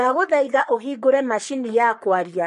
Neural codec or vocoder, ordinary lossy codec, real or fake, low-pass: codec, 44.1 kHz, 3.4 kbps, Pupu-Codec; MP3, 48 kbps; fake; 14.4 kHz